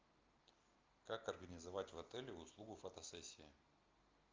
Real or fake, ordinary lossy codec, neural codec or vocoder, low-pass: real; Opus, 24 kbps; none; 7.2 kHz